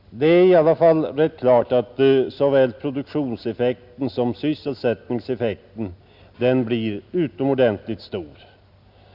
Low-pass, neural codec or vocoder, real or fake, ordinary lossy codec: 5.4 kHz; none; real; none